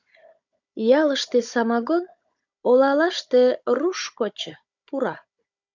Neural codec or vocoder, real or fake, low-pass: codec, 16 kHz, 16 kbps, FunCodec, trained on Chinese and English, 50 frames a second; fake; 7.2 kHz